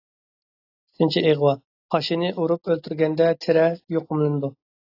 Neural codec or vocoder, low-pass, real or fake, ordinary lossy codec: none; 5.4 kHz; real; AAC, 32 kbps